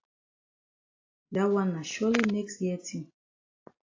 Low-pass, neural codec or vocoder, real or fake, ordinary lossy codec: 7.2 kHz; none; real; AAC, 32 kbps